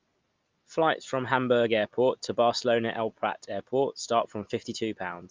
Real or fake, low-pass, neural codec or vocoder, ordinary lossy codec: real; 7.2 kHz; none; Opus, 24 kbps